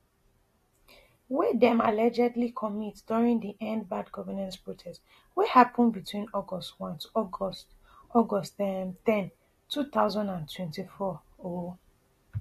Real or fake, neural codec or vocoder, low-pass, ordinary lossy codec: real; none; 14.4 kHz; AAC, 48 kbps